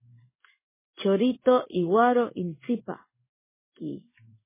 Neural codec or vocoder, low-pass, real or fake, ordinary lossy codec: codec, 16 kHz in and 24 kHz out, 1 kbps, XY-Tokenizer; 3.6 kHz; fake; MP3, 16 kbps